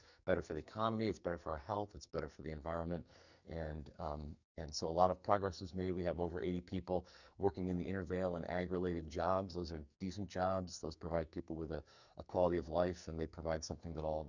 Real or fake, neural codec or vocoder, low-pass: fake; codec, 44.1 kHz, 2.6 kbps, SNAC; 7.2 kHz